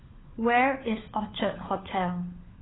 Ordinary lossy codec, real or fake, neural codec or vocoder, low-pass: AAC, 16 kbps; fake; codec, 16 kHz, 4 kbps, FunCodec, trained on LibriTTS, 50 frames a second; 7.2 kHz